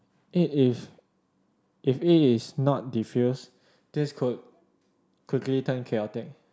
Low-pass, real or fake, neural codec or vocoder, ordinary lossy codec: none; real; none; none